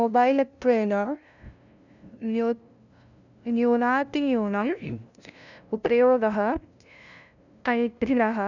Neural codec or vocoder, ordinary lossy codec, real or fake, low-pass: codec, 16 kHz, 0.5 kbps, FunCodec, trained on LibriTTS, 25 frames a second; none; fake; 7.2 kHz